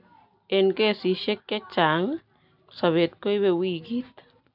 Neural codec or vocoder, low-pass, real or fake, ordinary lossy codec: none; 5.4 kHz; real; none